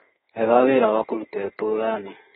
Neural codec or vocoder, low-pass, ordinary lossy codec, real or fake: codec, 32 kHz, 1.9 kbps, SNAC; 14.4 kHz; AAC, 16 kbps; fake